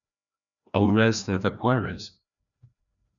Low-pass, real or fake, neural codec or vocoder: 7.2 kHz; fake; codec, 16 kHz, 1 kbps, FreqCodec, larger model